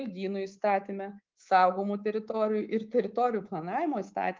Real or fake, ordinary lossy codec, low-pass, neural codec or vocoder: fake; Opus, 32 kbps; 7.2 kHz; codec, 24 kHz, 3.1 kbps, DualCodec